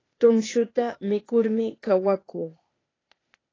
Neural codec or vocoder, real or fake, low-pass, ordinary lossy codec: codec, 16 kHz, 0.8 kbps, ZipCodec; fake; 7.2 kHz; AAC, 32 kbps